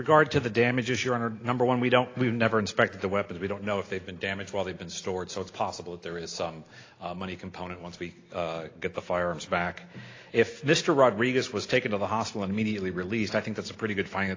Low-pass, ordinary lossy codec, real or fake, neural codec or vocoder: 7.2 kHz; AAC, 32 kbps; real; none